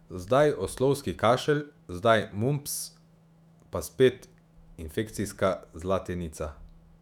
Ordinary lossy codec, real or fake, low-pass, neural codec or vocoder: none; fake; 19.8 kHz; autoencoder, 48 kHz, 128 numbers a frame, DAC-VAE, trained on Japanese speech